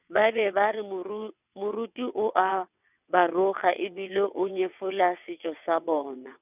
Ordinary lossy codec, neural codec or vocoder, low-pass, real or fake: none; vocoder, 22.05 kHz, 80 mel bands, WaveNeXt; 3.6 kHz; fake